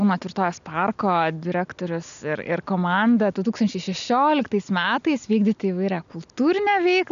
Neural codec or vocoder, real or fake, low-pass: none; real; 7.2 kHz